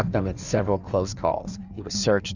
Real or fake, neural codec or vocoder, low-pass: fake; codec, 16 kHz in and 24 kHz out, 1.1 kbps, FireRedTTS-2 codec; 7.2 kHz